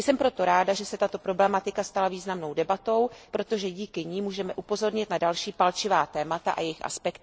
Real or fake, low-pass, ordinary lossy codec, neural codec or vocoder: real; none; none; none